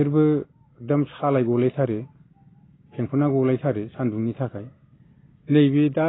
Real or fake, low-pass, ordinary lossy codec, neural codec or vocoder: real; 7.2 kHz; AAC, 16 kbps; none